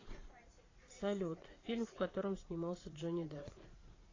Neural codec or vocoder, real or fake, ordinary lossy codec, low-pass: vocoder, 44.1 kHz, 80 mel bands, Vocos; fake; AAC, 32 kbps; 7.2 kHz